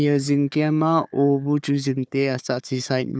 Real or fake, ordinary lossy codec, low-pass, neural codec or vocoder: fake; none; none; codec, 16 kHz, 4 kbps, FunCodec, trained on Chinese and English, 50 frames a second